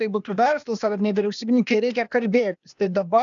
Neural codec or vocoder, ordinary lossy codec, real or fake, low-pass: codec, 16 kHz, 0.8 kbps, ZipCodec; MP3, 96 kbps; fake; 7.2 kHz